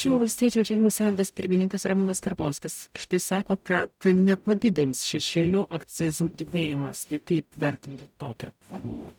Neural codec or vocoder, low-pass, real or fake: codec, 44.1 kHz, 0.9 kbps, DAC; 19.8 kHz; fake